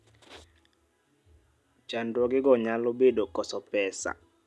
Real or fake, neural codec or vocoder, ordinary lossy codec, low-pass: real; none; none; none